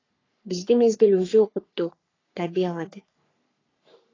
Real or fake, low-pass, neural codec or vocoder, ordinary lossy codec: fake; 7.2 kHz; codec, 44.1 kHz, 3.4 kbps, Pupu-Codec; AAC, 32 kbps